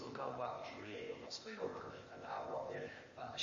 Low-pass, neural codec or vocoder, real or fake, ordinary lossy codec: 7.2 kHz; codec, 16 kHz, 0.8 kbps, ZipCodec; fake; MP3, 32 kbps